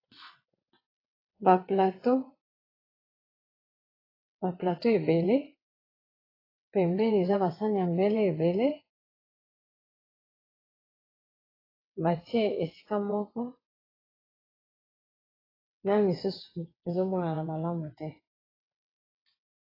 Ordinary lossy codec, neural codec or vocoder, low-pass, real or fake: AAC, 24 kbps; vocoder, 22.05 kHz, 80 mel bands, WaveNeXt; 5.4 kHz; fake